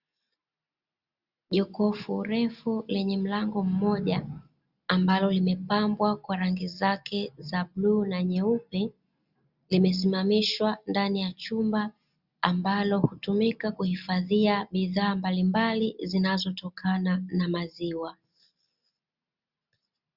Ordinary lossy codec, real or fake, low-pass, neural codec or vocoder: Opus, 64 kbps; real; 5.4 kHz; none